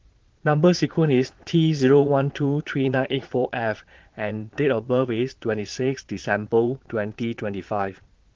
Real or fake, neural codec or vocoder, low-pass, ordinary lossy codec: fake; vocoder, 22.05 kHz, 80 mel bands, Vocos; 7.2 kHz; Opus, 16 kbps